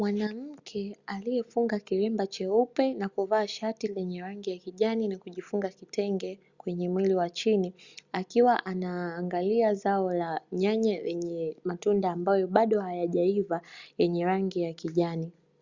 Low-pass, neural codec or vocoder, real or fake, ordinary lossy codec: 7.2 kHz; none; real; Opus, 64 kbps